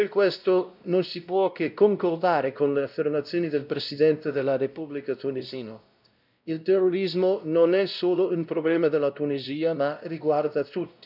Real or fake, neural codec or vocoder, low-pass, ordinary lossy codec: fake; codec, 16 kHz, 0.5 kbps, X-Codec, WavLM features, trained on Multilingual LibriSpeech; 5.4 kHz; none